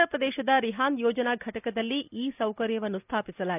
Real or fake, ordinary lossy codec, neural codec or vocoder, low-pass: real; none; none; 3.6 kHz